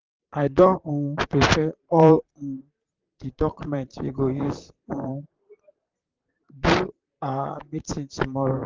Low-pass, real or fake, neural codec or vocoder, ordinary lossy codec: 7.2 kHz; fake; vocoder, 44.1 kHz, 128 mel bands, Pupu-Vocoder; Opus, 32 kbps